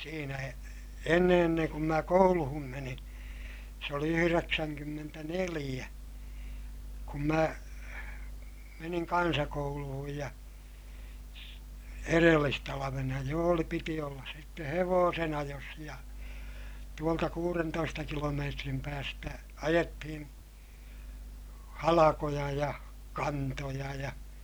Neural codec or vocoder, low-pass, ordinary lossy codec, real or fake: none; none; none; real